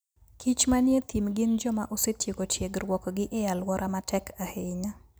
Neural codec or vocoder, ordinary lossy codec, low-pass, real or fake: none; none; none; real